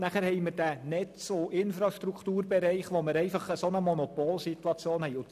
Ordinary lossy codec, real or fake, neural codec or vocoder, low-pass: none; real; none; 14.4 kHz